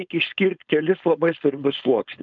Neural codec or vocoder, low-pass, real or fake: codec, 16 kHz, 4.8 kbps, FACodec; 7.2 kHz; fake